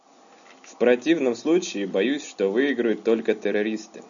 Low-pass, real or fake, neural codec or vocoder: 7.2 kHz; real; none